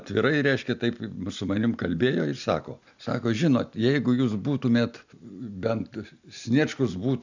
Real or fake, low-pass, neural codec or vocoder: real; 7.2 kHz; none